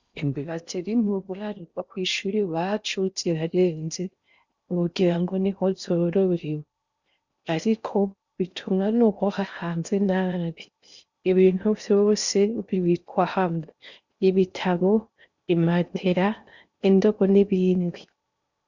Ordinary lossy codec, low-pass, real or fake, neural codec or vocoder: Opus, 64 kbps; 7.2 kHz; fake; codec, 16 kHz in and 24 kHz out, 0.6 kbps, FocalCodec, streaming, 4096 codes